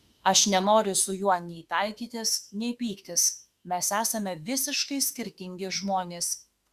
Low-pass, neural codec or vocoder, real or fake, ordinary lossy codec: 14.4 kHz; autoencoder, 48 kHz, 32 numbers a frame, DAC-VAE, trained on Japanese speech; fake; Opus, 64 kbps